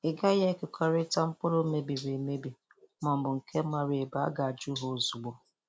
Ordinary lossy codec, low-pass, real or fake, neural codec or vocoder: none; none; real; none